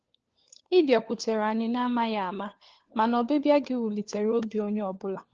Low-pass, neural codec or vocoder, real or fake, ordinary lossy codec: 7.2 kHz; codec, 16 kHz, 4 kbps, FunCodec, trained on LibriTTS, 50 frames a second; fake; Opus, 16 kbps